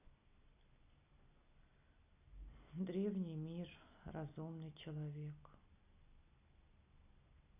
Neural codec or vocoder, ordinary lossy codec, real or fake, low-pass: none; none; real; 3.6 kHz